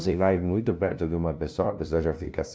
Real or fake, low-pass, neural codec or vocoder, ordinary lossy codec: fake; none; codec, 16 kHz, 0.5 kbps, FunCodec, trained on LibriTTS, 25 frames a second; none